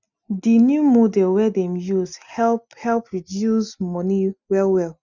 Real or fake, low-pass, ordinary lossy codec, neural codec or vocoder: real; 7.2 kHz; none; none